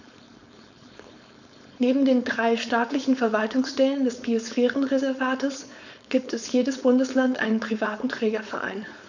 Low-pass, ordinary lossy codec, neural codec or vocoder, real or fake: 7.2 kHz; none; codec, 16 kHz, 4.8 kbps, FACodec; fake